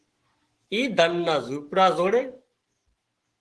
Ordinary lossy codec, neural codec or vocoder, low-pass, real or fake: Opus, 16 kbps; autoencoder, 48 kHz, 128 numbers a frame, DAC-VAE, trained on Japanese speech; 10.8 kHz; fake